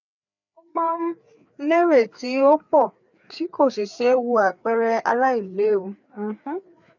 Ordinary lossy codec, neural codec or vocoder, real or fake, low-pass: none; codec, 16 kHz, 4 kbps, FreqCodec, larger model; fake; 7.2 kHz